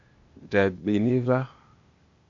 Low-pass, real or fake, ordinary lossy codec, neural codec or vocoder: 7.2 kHz; fake; AAC, 64 kbps; codec, 16 kHz, 0.8 kbps, ZipCodec